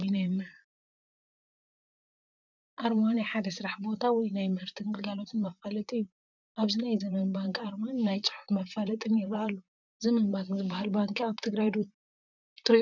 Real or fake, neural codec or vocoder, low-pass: fake; vocoder, 44.1 kHz, 128 mel bands, Pupu-Vocoder; 7.2 kHz